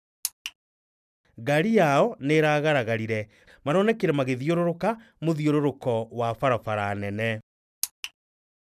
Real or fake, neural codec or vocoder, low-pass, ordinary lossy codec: real; none; 14.4 kHz; none